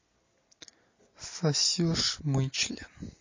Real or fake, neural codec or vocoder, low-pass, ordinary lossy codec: real; none; 7.2 kHz; MP3, 32 kbps